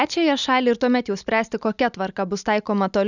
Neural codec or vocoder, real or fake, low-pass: none; real; 7.2 kHz